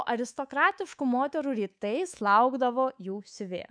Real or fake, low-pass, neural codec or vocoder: fake; 9.9 kHz; codec, 24 kHz, 3.1 kbps, DualCodec